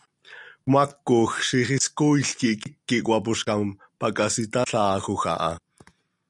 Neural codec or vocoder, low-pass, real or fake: none; 10.8 kHz; real